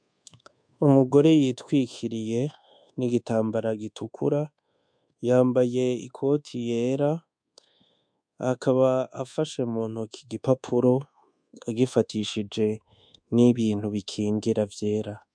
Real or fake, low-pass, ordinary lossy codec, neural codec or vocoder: fake; 9.9 kHz; MP3, 64 kbps; codec, 24 kHz, 1.2 kbps, DualCodec